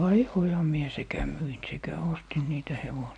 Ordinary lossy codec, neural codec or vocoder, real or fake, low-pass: none; none; real; 9.9 kHz